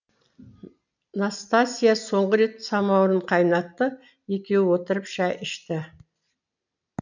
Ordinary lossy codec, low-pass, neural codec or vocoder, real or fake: none; 7.2 kHz; none; real